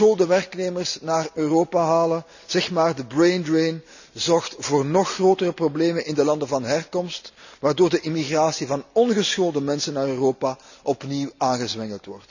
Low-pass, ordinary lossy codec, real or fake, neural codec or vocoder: 7.2 kHz; none; real; none